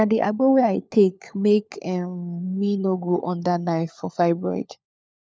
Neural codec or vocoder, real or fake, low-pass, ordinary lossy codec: codec, 16 kHz, 4 kbps, FunCodec, trained on LibriTTS, 50 frames a second; fake; none; none